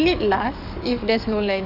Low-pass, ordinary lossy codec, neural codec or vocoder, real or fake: 5.4 kHz; none; codec, 44.1 kHz, 7.8 kbps, DAC; fake